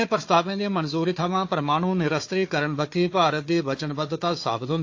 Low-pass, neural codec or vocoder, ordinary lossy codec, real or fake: 7.2 kHz; codec, 16 kHz, 4 kbps, FunCodec, trained on Chinese and English, 50 frames a second; AAC, 48 kbps; fake